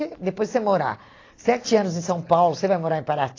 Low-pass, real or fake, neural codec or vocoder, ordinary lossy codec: 7.2 kHz; real; none; AAC, 32 kbps